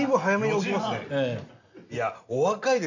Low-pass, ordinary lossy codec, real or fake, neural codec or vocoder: 7.2 kHz; none; real; none